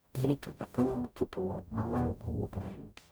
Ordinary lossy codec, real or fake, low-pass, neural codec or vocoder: none; fake; none; codec, 44.1 kHz, 0.9 kbps, DAC